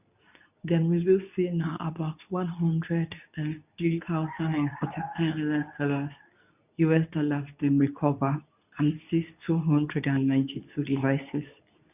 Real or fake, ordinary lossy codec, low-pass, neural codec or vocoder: fake; none; 3.6 kHz; codec, 24 kHz, 0.9 kbps, WavTokenizer, medium speech release version 2